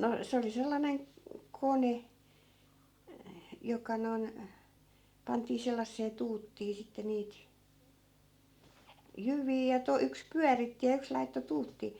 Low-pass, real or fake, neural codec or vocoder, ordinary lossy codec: 19.8 kHz; real; none; Opus, 64 kbps